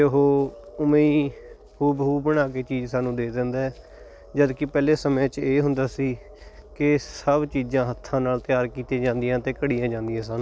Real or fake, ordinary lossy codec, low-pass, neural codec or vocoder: real; none; none; none